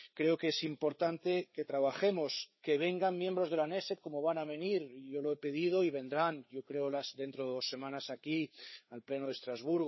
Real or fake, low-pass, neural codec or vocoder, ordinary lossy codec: fake; 7.2 kHz; codec, 16 kHz, 4 kbps, FreqCodec, larger model; MP3, 24 kbps